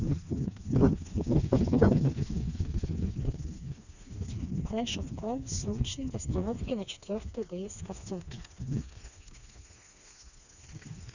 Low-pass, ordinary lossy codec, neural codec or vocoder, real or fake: 7.2 kHz; none; codec, 16 kHz, 2 kbps, FreqCodec, smaller model; fake